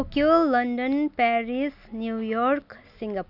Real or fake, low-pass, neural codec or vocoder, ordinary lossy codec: real; 5.4 kHz; none; none